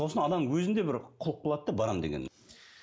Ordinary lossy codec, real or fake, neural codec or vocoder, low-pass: none; real; none; none